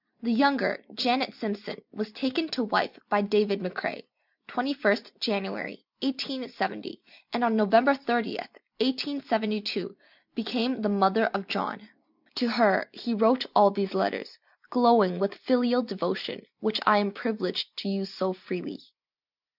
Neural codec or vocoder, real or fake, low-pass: none; real; 5.4 kHz